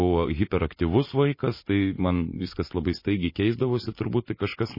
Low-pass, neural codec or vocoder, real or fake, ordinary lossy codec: 5.4 kHz; none; real; MP3, 24 kbps